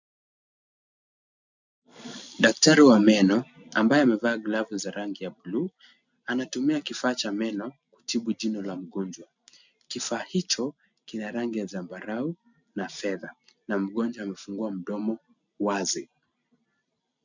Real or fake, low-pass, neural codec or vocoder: real; 7.2 kHz; none